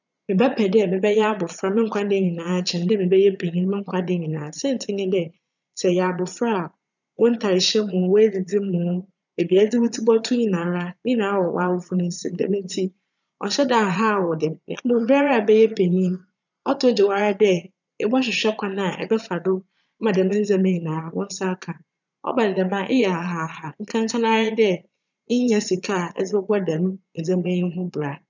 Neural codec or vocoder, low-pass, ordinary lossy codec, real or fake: vocoder, 22.05 kHz, 80 mel bands, Vocos; 7.2 kHz; none; fake